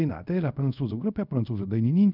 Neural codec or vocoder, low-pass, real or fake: codec, 24 kHz, 0.5 kbps, DualCodec; 5.4 kHz; fake